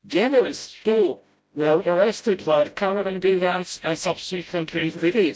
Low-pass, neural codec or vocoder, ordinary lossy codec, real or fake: none; codec, 16 kHz, 0.5 kbps, FreqCodec, smaller model; none; fake